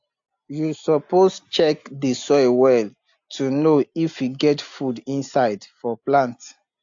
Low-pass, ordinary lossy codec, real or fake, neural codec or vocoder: 7.2 kHz; AAC, 64 kbps; real; none